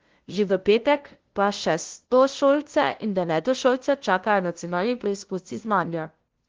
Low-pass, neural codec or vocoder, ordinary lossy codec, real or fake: 7.2 kHz; codec, 16 kHz, 0.5 kbps, FunCodec, trained on LibriTTS, 25 frames a second; Opus, 16 kbps; fake